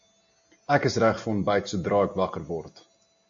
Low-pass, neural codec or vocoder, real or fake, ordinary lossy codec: 7.2 kHz; none; real; AAC, 64 kbps